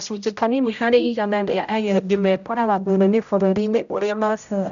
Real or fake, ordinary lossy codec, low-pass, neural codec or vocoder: fake; none; 7.2 kHz; codec, 16 kHz, 0.5 kbps, X-Codec, HuBERT features, trained on general audio